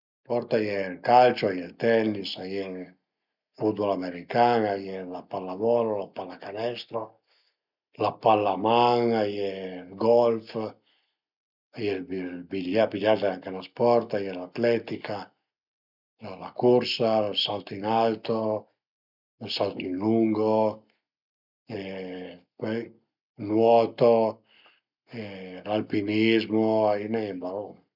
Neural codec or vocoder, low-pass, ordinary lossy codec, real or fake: none; 5.4 kHz; none; real